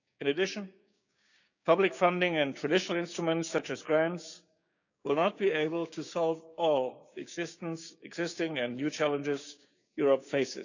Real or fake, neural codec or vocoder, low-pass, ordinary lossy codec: fake; codec, 16 kHz, 6 kbps, DAC; 7.2 kHz; none